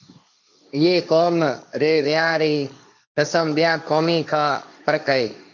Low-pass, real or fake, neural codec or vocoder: 7.2 kHz; fake; codec, 16 kHz, 1.1 kbps, Voila-Tokenizer